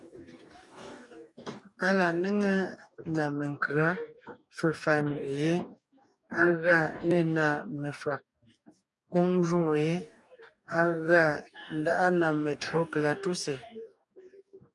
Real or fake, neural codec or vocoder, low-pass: fake; codec, 44.1 kHz, 2.6 kbps, DAC; 10.8 kHz